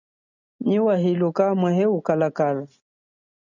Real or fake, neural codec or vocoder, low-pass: real; none; 7.2 kHz